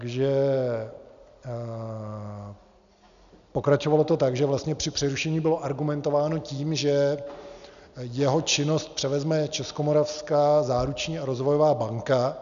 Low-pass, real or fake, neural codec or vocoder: 7.2 kHz; real; none